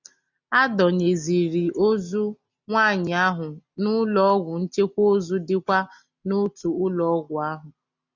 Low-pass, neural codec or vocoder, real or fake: 7.2 kHz; none; real